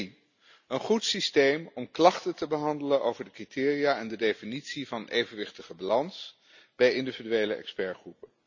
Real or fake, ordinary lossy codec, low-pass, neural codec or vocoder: real; none; 7.2 kHz; none